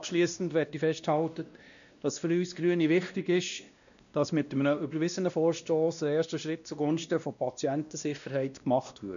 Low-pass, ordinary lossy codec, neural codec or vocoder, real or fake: 7.2 kHz; none; codec, 16 kHz, 1 kbps, X-Codec, WavLM features, trained on Multilingual LibriSpeech; fake